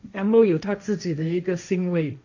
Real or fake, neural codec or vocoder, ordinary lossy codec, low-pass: fake; codec, 16 kHz, 1.1 kbps, Voila-Tokenizer; none; 7.2 kHz